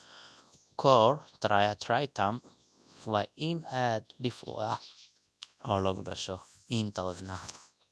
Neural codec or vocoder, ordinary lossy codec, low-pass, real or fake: codec, 24 kHz, 0.9 kbps, WavTokenizer, large speech release; none; none; fake